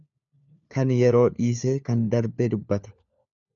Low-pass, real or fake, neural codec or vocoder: 7.2 kHz; fake; codec, 16 kHz, 4 kbps, FunCodec, trained on LibriTTS, 50 frames a second